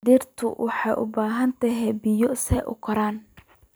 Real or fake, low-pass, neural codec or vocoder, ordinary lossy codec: fake; none; vocoder, 44.1 kHz, 128 mel bands every 256 samples, BigVGAN v2; none